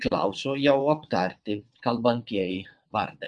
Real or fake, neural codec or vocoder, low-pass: fake; vocoder, 22.05 kHz, 80 mel bands, WaveNeXt; 9.9 kHz